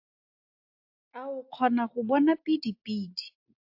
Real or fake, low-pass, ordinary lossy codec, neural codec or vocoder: real; 5.4 kHz; AAC, 48 kbps; none